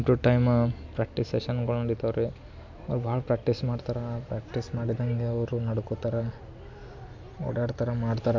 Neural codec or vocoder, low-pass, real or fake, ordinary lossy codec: none; 7.2 kHz; real; MP3, 64 kbps